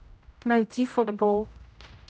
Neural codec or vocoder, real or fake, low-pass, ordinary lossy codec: codec, 16 kHz, 0.5 kbps, X-Codec, HuBERT features, trained on general audio; fake; none; none